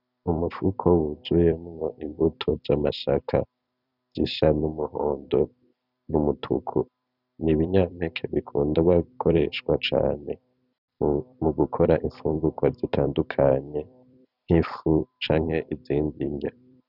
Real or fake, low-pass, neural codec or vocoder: fake; 5.4 kHz; vocoder, 44.1 kHz, 128 mel bands every 256 samples, BigVGAN v2